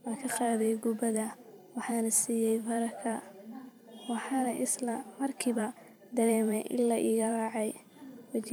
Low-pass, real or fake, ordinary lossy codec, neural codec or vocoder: none; fake; none; vocoder, 44.1 kHz, 128 mel bands every 512 samples, BigVGAN v2